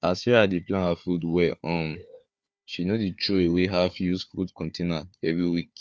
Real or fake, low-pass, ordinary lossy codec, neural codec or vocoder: fake; none; none; codec, 16 kHz, 4 kbps, FunCodec, trained on Chinese and English, 50 frames a second